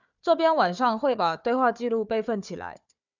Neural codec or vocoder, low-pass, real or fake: codec, 16 kHz, 4 kbps, FunCodec, trained on Chinese and English, 50 frames a second; 7.2 kHz; fake